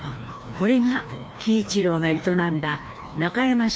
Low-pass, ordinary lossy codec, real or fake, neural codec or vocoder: none; none; fake; codec, 16 kHz, 1 kbps, FreqCodec, larger model